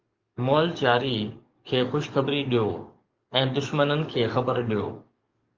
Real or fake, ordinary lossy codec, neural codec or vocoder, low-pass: fake; Opus, 24 kbps; codec, 44.1 kHz, 7.8 kbps, DAC; 7.2 kHz